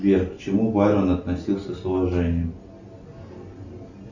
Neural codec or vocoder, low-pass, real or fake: none; 7.2 kHz; real